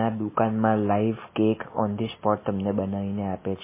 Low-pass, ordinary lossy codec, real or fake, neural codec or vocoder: 3.6 kHz; MP3, 16 kbps; real; none